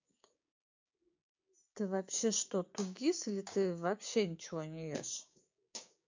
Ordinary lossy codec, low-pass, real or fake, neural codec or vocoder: MP3, 64 kbps; 7.2 kHz; fake; codec, 16 kHz, 6 kbps, DAC